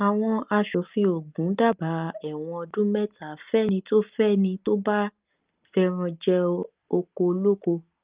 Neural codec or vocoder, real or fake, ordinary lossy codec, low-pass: none; real; Opus, 32 kbps; 3.6 kHz